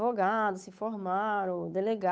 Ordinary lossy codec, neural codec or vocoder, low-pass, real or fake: none; codec, 16 kHz, 8 kbps, FunCodec, trained on Chinese and English, 25 frames a second; none; fake